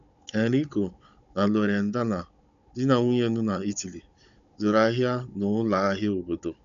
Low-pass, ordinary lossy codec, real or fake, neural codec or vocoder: 7.2 kHz; none; fake; codec, 16 kHz, 16 kbps, FunCodec, trained on Chinese and English, 50 frames a second